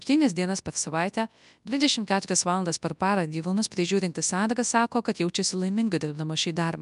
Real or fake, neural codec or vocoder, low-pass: fake; codec, 24 kHz, 0.9 kbps, WavTokenizer, large speech release; 10.8 kHz